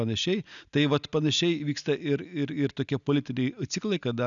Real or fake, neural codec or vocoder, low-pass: real; none; 7.2 kHz